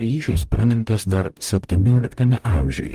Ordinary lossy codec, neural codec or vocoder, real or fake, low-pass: Opus, 32 kbps; codec, 44.1 kHz, 0.9 kbps, DAC; fake; 14.4 kHz